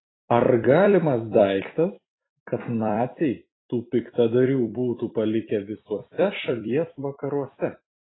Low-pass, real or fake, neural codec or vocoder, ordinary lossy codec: 7.2 kHz; fake; vocoder, 44.1 kHz, 128 mel bands every 256 samples, BigVGAN v2; AAC, 16 kbps